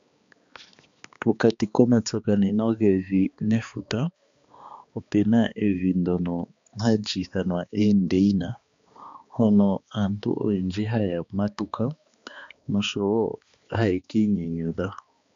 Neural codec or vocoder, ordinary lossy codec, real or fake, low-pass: codec, 16 kHz, 2 kbps, X-Codec, HuBERT features, trained on balanced general audio; MP3, 64 kbps; fake; 7.2 kHz